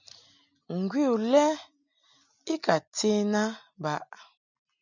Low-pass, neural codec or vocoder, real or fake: 7.2 kHz; none; real